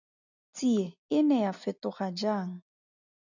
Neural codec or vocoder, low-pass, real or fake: none; 7.2 kHz; real